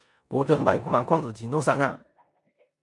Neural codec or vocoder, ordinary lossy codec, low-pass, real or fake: codec, 16 kHz in and 24 kHz out, 0.9 kbps, LongCat-Audio-Codec, four codebook decoder; MP3, 96 kbps; 10.8 kHz; fake